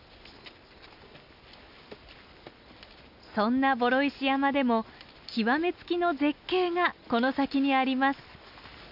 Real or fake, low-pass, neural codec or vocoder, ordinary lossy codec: real; 5.4 kHz; none; none